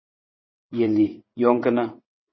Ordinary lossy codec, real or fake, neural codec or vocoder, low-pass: MP3, 24 kbps; fake; vocoder, 22.05 kHz, 80 mel bands, WaveNeXt; 7.2 kHz